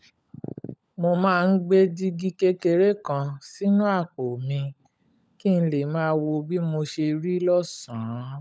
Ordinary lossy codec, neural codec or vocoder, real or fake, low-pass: none; codec, 16 kHz, 16 kbps, FunCodec, trained on LibriTTS, 50 frames a second; fake; none